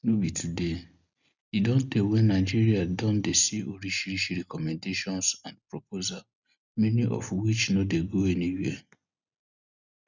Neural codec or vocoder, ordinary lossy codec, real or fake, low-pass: none; none; real; 7.2 kHz